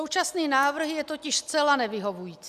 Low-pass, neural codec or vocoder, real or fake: 14.4 kHz; none; real